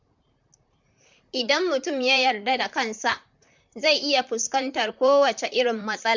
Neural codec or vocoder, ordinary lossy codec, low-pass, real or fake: vocoder, 44.1 kHz, 128 mel bands, Pupu-Vocoder; MP3, 64 kbps; 7.2 kHz; fake